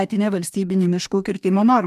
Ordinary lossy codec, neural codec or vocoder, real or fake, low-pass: MP3, 96 kbps; codec, 44.1 kHz, 2.6 kbps, DAC; fake; 14.4 kHz